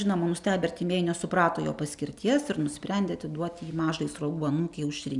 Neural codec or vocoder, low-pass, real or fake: none; 10.8 kHz; real